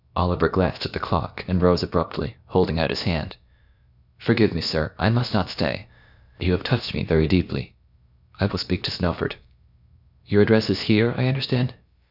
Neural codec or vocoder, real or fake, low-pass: codec, 16 kHz, about 1 kbps, DyCAST, with the encoder's durations; fake; 5.4 kHz